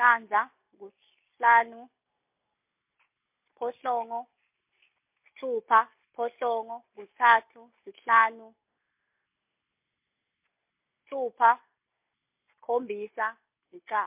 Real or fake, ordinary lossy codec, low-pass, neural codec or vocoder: real; MP3, 32 kbps; 3.6 kHz; none